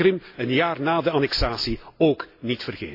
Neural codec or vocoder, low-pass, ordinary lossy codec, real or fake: none; 5.4 kHz; AAC, 32 kbps; real